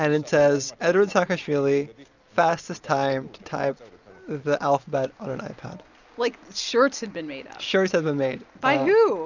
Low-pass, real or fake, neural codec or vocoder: 7.2 kHz; real; none